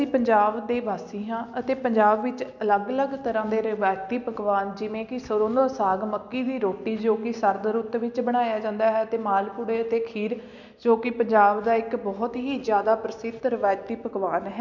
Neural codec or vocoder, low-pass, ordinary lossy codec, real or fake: none; 7.2 kHz; none; real